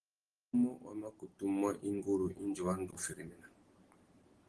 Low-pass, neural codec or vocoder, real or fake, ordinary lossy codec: 10.8 kHz; none; real; Opus, 16 kbps